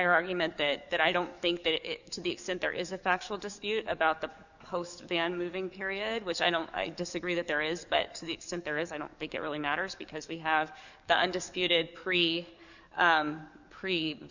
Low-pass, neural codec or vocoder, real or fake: 7.2 kHz; codec, 44.1 kHz, 7.8 kbps, DAC; fake